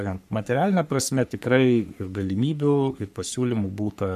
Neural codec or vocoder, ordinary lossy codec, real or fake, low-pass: codec, 44.1 kHz, 3.4 kbps, Pupu-Codec; MP3, 96 kbps; fake; 14.4 kHz